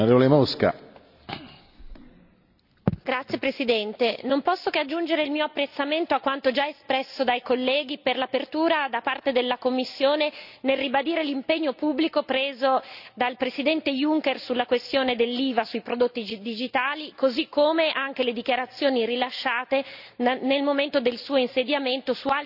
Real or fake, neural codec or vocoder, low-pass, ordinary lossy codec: real; none; 5.4 kHz; none